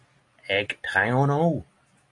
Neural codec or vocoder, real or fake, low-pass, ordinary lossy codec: none; real; 10.8 kHz; AAC, 48 kbps